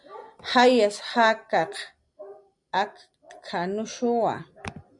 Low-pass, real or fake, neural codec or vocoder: 10.8 kHz; real; none